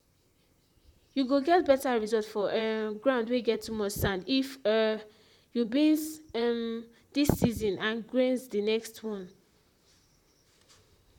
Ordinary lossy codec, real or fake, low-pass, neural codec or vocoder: none; fake; 19.8 kHz; vocoder, 44.1 kHz, 128 mel bands, Pupu-Vocoder